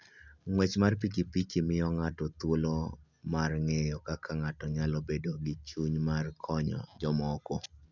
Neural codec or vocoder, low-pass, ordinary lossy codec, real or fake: none; 7.2 kHz; none; real